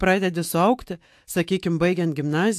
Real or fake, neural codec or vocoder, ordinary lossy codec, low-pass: fake; autoencoder, 48 kHz, 128 numbers a frame, DAC-VAE, trained on Japanese speech; AAC, 64 kbps; 14.4 kHz